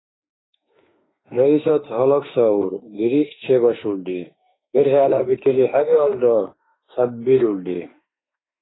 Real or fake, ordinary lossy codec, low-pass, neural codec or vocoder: fake; AAC, 16 kbps; 7.2 kHz; autoencoder, 48 kHz, 32 numbers a frame, DAC-VAE, trained on Japanese speech